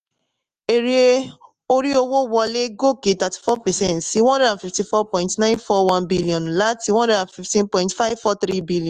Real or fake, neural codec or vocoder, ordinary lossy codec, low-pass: fake; autoencoder, 48 kHz, 128 numbers a frame, DAC-VAE, trained on Japanese speech; Opus, 32 kbps; 14.4 kHz